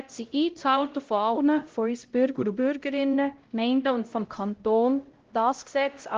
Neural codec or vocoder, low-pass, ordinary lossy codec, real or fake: codec, 16 kHz, 0.5 kbps, X-Codec, HuBERT features, trained on LibriSpeech; 7.2 kHz; Opus, 16 kbps; fake